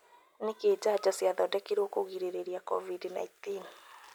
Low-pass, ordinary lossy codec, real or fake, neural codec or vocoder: none; none; real; none